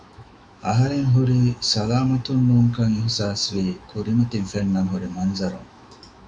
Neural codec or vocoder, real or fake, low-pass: autoencoder, 48 kHz, 128 numbers a frame, DAC-VAE, trained on Japanese speech; fake; 9.9 kHz